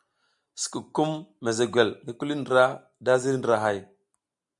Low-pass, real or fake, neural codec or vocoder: 10.8 kHz; real; none